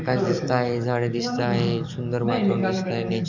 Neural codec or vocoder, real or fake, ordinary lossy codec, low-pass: codec, 44.1 kHz, 7.8 kbps, DAC; fake; none; 7.2 kHz